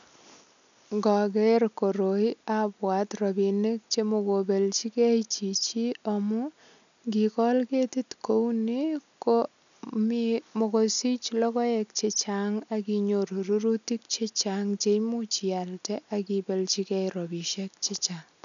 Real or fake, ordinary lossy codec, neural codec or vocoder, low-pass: real; none; none; 7.2 kHz